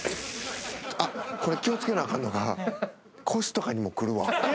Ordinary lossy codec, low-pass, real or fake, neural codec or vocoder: none; none; real; none